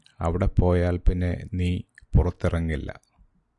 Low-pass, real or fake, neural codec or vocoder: 10.8 kHz; real; none